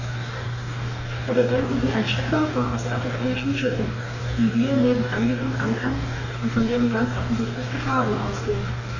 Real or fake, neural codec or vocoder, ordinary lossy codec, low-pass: fake; codec, 44.1 kHz, 2.6 kbps, DAC; none; 7.2 kHz